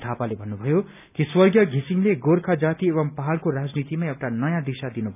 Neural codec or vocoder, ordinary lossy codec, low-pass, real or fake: none; none; 3.6 kHz; real